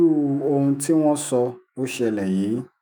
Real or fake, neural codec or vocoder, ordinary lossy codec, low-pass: fake; autoencoder, 48 kHz, 128 numbers a frame, DAC-VAE, trained on Japanese speech; none; none